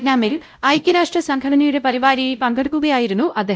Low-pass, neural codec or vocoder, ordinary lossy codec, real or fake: none; codec, 16 kHz, 0.5 kbps, X-Codec, WavLM features, trained on Multilingual LibriSpeech; none; fake